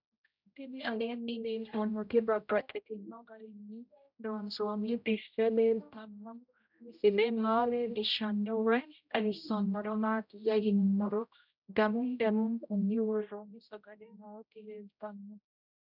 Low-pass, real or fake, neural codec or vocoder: 5.4 kHz; fake; codec, 16 kHz, 0.5 kbps, X-Codec, HuBERT features, trained on general audio